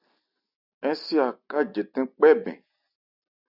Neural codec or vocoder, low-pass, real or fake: none; 5.4 kHz; real